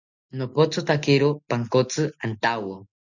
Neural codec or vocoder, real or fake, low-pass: none; real; 7.2 kHz